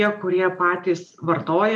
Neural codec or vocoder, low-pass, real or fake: none; 10.8 kHz; real